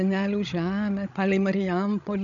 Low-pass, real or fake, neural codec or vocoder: 7.2 kHz; fake; codec, 16 kHz, 16 kbps, FreqCodec, larger model